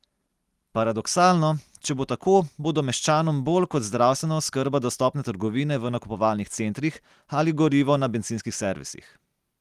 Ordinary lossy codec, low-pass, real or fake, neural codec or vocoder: Opus, 24 kbps; 14.4 kHz; real; none